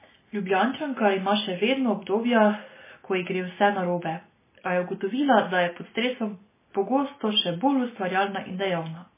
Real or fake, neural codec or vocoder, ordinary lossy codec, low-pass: real; none; MP3, 16 kbps; 3.6 kHz